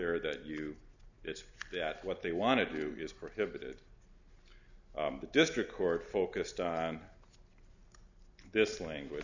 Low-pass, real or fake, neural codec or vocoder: 7.2 kHz; real; none